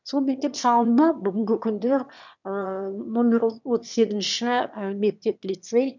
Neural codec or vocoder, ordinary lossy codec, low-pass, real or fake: autoencoder, 22.05 kHz, a latent of 192 numbers a frame, VITS, trained on one speaker; none; 7.2 kHz; fake